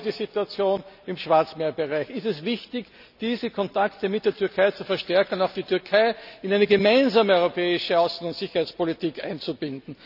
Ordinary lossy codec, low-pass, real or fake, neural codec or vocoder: none; 5.4 kHz; real; none